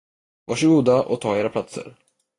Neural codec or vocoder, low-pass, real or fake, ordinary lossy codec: none; 10.8 kHz; real; AAC, 32 kbps